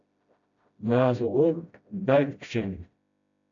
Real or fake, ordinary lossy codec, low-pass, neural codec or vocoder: fake; AAC, 64 kbps; 7.2 kHz; codec, 16 kHz, 0.5 kbps, FreqCodec, smaller model